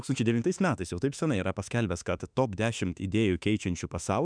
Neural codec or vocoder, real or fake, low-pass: autoencoder, 48 kHz, 32 numbers a frame, DAC-VAE, trained on Japanese speech; fake; 9.9 kHz